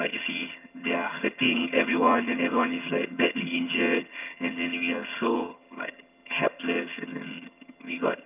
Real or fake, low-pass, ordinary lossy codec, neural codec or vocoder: fake; 3.6 kHz; none; vocoder, 22.05 kHz, 80 mel bands, HiFi-GAN